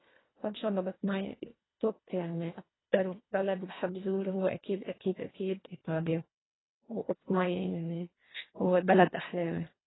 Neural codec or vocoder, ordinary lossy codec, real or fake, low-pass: codec, 24 kHz, 1.5 kbps, HILCodec; AAC, 16 kbps; fake; 7.2 kHz